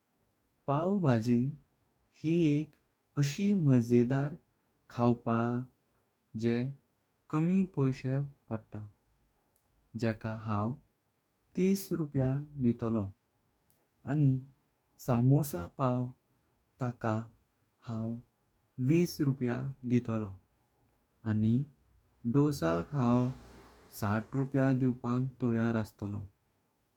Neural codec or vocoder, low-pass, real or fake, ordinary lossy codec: codec, 44.1 kHz, 2.6 kbps, DAC; 19.8 kHz; fake; MP3, 96 kbps